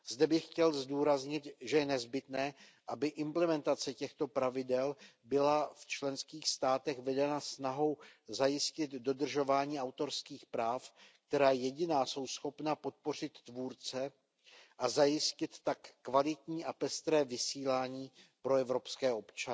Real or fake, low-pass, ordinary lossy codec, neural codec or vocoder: real; none; none; none